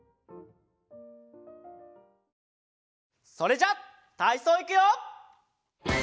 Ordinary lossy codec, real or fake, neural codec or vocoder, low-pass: none; real; none; none